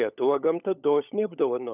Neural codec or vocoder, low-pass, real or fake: codec, 16 kHz, 16 kbps, FunCodec, trained on LibriTTS, 50 frames a second; 3.6 kHz; fake